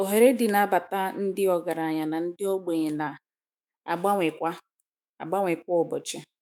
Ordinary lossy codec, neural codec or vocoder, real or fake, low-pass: none; autoencoder, 48 kHz, 128 numbers a frame, DAC-VAE, trained on Japanese speech; fake; none